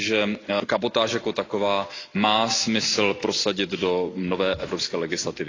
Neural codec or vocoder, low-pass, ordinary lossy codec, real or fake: none; 7.2 kHz; AAC, 32 kbps; real